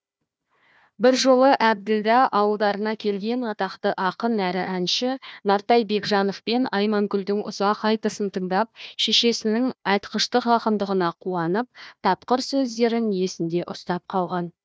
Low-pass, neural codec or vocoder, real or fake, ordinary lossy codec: none; codec, 16 kHz, 1 kbps, FunCodec, trained on Chinese and English, 50 frames a second; fake; none